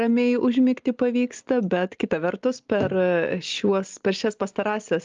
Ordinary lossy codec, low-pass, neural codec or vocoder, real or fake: Opus, 32 kbps; 7.2 kHz; none; real